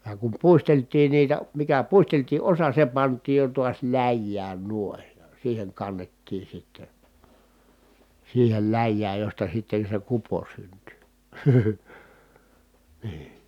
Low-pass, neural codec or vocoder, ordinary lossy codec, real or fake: 19.8 kHz; none; none; real